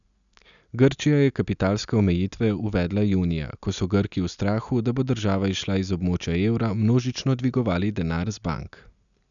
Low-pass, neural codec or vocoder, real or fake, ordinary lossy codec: 7.2 kHz; none; real; none